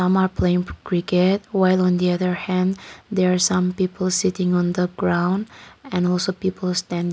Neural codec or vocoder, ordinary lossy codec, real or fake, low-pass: none; none; real; none